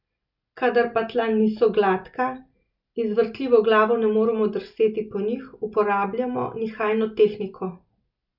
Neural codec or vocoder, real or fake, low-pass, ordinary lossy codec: none; real; 5.4 kHz; none